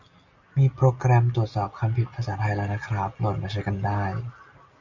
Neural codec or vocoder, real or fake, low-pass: none; real; 7.2 kHz